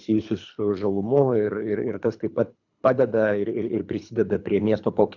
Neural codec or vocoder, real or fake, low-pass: codec, 24 kHz, 3 kbps, HILCodec; fake; 7.2 kHz